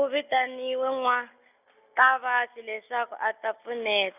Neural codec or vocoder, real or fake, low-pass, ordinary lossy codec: none; real; 3.6 kHz; none